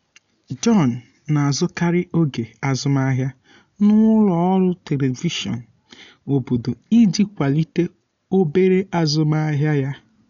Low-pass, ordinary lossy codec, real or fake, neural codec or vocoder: 7.2 kHz; none; real; none